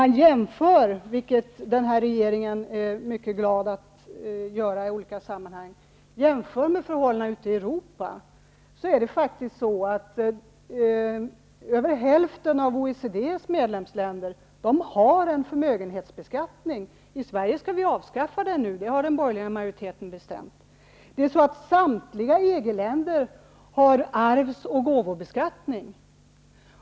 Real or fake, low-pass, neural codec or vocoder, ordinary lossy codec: real; none; none; none